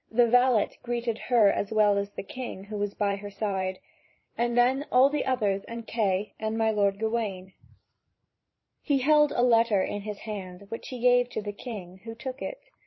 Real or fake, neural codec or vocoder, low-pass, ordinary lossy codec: fake; vocoder, 44.1 kHz, 128 mel bands every 512 samples, BigVGAN v2; 7.2 kHz; MP3, 24 kbps